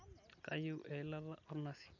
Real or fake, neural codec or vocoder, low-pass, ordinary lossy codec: real; none; 7.2 kHz; none